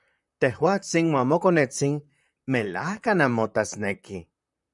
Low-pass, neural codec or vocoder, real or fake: 10.8 kHz; vocoder, 44.1 kHz, 128 mel bands, Pupu-Vocoder; fake